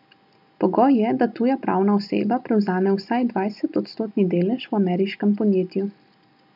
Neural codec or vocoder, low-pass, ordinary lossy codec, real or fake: none; 5.4 kHz; none; real